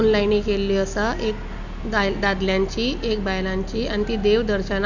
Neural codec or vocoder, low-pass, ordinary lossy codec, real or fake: none; 7.2 kHz; none; real